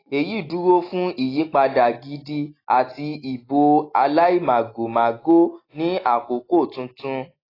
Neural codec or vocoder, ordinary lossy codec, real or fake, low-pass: none; AAC, 24 kbps; real; 5.4 kHz